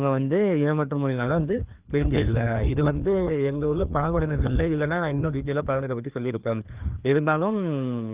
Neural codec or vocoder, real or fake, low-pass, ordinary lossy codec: codec, 16 kHz, 2 kbps, FreqCodec, larger model; fake; 3.6 kHz; Opus, 24 kbps